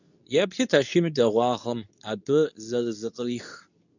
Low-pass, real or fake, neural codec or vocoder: 7.2 kHz; fake; codec, 24 kHz, 0.9 kbps, WavTokenizer, medium speech release version 2